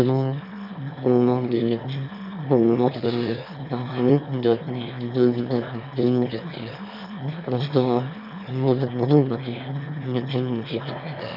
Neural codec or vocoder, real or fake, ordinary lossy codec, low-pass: autoencoder, 22.05 kHz, a latent of 192 numbers a frame, VITS, trained on one speaker; fake; none; 5.4 kHz